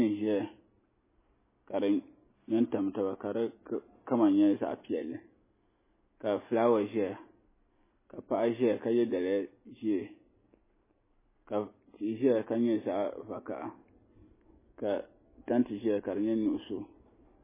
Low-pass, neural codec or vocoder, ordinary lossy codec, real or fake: 3.6 kHz; none; MP3, 16 kbps; real